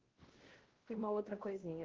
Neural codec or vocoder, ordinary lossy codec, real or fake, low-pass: codec, 16 kHz, 1 kbps, X-Codec, WavLM features, trained on Multilingual LibriSpeech; Opus, 16 kbps; fake; 7.2 kHz